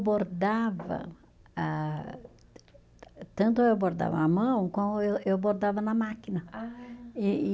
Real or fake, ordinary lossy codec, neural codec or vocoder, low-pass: real; none; none; none